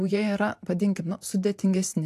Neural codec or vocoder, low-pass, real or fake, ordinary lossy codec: vocoder, 44.1 kHz, 128 mel bands every 512 samples, BigVGAN v2; 14.4 kHz; fake; AAC, 64 kbps